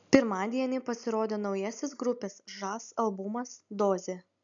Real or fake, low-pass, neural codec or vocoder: real; 7.2 kHz; none